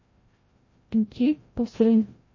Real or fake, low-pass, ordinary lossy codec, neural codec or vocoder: fake; 7.2 kHz; MP3, 32 kbps; codec, 16 kHz, 0.5 kbps, FreqCodec, larger model